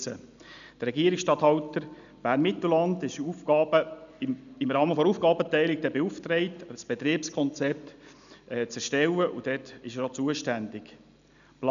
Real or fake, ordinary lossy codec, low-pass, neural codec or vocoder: real; none; 7.2 kHz; none